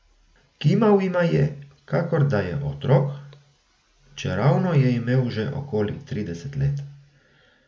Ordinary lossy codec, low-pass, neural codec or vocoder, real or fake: none; none; none; real